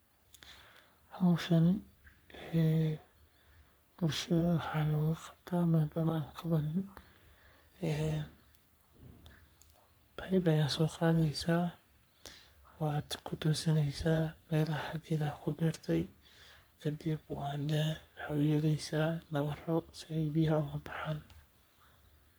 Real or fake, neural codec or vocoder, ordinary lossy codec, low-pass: fake; codec, 44.1 kHz, 3.4 kbps, Pupu-Codec; none; none